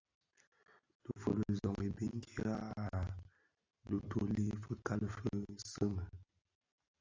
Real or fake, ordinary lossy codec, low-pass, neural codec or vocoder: real; AAC, 48 kbps; 7.2 kHz; none